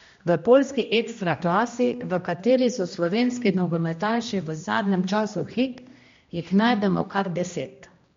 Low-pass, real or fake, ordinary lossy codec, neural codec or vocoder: 7.2 kHz; fake; MP3, 48 kbps; codec, 16 kHz, 1 kbps, X-Codec, HuBERT features, trained on general audio